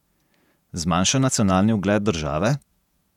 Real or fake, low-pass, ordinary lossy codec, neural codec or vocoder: fake; 19.8 kHz; none; vocoder, 48 kHz, 128 mel bands, Vocos